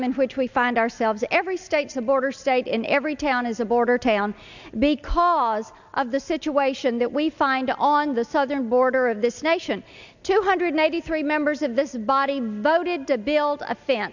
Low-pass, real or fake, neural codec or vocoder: 7.2 kHz; real; none